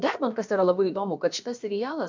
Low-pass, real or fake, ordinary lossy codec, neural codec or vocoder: 7.2 kHz; fake; MP3, 64 kbps; codec, 16 kHz, about 1 kbps, DyCAST, with the encoder's durations